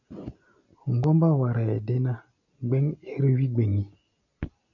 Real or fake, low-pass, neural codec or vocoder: real; 7.2 kHz; none